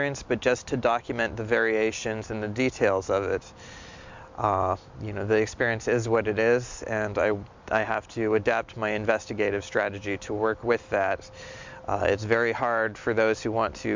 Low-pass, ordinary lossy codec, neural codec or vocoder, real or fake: 7.2 kHz; MP3, 64 kbps; none; real